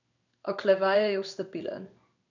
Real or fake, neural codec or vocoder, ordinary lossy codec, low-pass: fake; codec, 16 kHz in and 24 kHz out, 1 kbps, XY-Tokenizer; none; 7.2 kHz